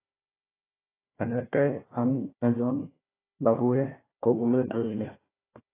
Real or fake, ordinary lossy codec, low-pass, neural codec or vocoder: fake; AAC, 16 kbps; 3.6 kHz; codec, 16 kHz, 1 kbps, FunCodec, trained on Chinese and English, 50 frames a second